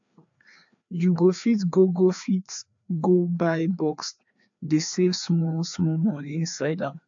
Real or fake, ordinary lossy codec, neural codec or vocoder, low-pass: fake; AAC, 64 kbps; codec, 16 kHz, 2 kbps, FreqCodec, larger model; 7.2 kHz